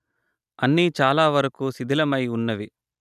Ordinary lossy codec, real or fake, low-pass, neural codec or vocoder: none; real; 14.4 kHz; none